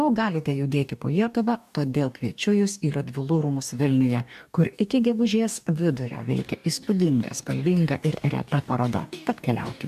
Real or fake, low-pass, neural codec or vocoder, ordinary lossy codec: fake; 14.4 kHz; codec, 44.1 kHz, 2.6 kbps, DAC; AAC, 96 kbps